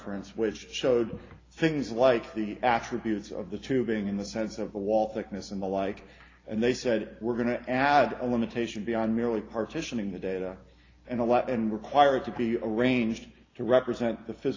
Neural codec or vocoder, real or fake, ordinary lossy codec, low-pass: none; real; AAC, 32 kbps; 7.2 kHz